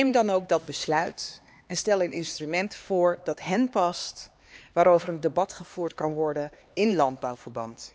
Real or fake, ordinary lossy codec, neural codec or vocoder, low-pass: fake; none; codec, 16 kHz, 2 kbps, X-Codec, HuBERT features, trained on LibriSpeech; none